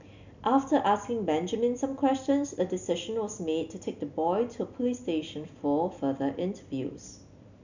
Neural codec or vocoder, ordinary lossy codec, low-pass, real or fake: none; none; 7.2 kHz; real